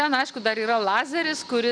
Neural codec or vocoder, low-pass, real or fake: none; 9.9 kHz; real